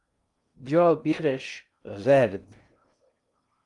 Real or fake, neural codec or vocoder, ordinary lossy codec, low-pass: fake; codec, 16 kHz in and 24 kHz out, 0.6 kbps, FocalCodec, streaming, 2048 codes; Opus, 32 kbps; 10.8 kHz